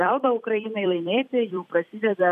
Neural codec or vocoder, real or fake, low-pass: vocoder, 44.1 kHz, 128 mel bands every 256 samples, BigVGAN v2; fake; 14.4 kHz